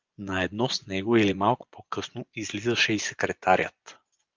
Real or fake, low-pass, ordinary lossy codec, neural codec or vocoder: fake; 7.2 kHz; Opus, 24 kbps; vocoder, 24 kHz, 100 mel bands, Vocos